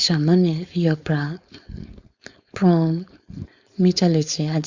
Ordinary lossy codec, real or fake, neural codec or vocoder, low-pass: Opus, 64 kbps; fake; codec, 16 kHz, 4.8 kbps, FACodec; 7.2 kHz